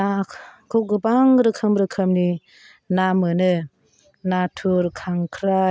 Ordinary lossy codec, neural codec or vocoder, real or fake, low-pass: none; none; real; none